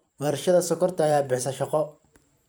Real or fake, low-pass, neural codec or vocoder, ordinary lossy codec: fake; none; vocoder, 44.1 kHz, 128 mel bands every 512 samples, BigVGAN v2; none